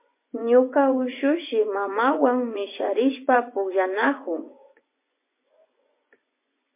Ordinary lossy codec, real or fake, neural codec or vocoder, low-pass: MP3, 24 kbps; fake; vocoder, 24 kHz, 100 mel bands, Vocos; 3.6 kHz